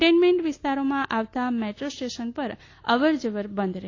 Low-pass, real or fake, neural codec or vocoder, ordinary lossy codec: 7.2 kHz; real; none; AAC, 32 kbps